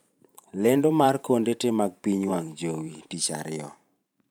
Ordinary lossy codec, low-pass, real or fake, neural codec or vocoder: none; none; fake; vocoder, 44.1 kHz, 128 mel bands every 512 samples, BigVGAN v2